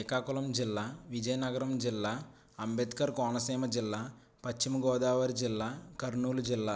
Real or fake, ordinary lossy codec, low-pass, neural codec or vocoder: real; none; none; none